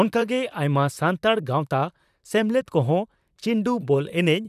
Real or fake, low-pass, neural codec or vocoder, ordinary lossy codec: fake; 14.4 kHz; vocoder, 44.1 kHz, 128 mel bands, Pupu-Vocoder; none